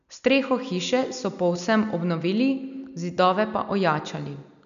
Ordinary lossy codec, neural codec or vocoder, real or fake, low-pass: none; none; real; 7.2 kHz